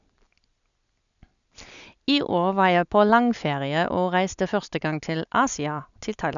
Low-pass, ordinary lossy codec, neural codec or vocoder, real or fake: 7.2 kHz; none; none; real